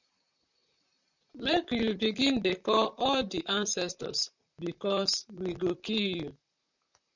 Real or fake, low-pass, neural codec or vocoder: fake; 7.2 kHz; vocoder, 44.1 kHz, 128 mel bands, Pupu-Vocoder